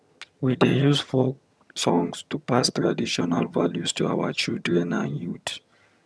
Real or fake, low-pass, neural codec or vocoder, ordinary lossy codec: fake; none; vocoder, 22.05 kHz, 80 mel bands, HiFi-GAN; none